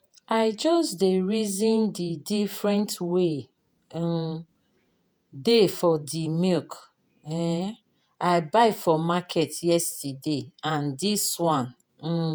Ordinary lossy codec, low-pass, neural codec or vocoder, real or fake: none; none; vocoder, 48 kHz, 128 mel bands, Vocos; fake